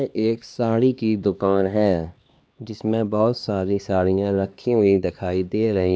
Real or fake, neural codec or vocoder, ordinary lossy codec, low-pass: fake; codec, 16 kHz, 2 kbps, X-Codec, HuBERT features, trained on LibriSpeech; none; none